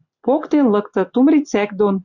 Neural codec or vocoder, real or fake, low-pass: none; real; 7.2 kHz